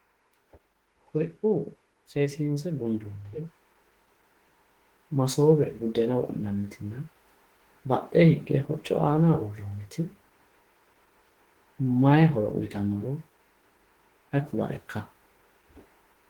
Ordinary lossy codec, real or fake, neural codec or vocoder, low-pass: Opus, 16 kbps; fake; autoencoder, 48 kHz, 32 numbers a frame, DAC-VAE, trained on Japanese speech; 19.8 kHz